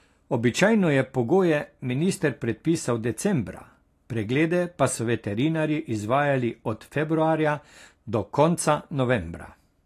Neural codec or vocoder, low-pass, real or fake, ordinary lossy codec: none; 14.4 kHz; real; AAC, 48 kbps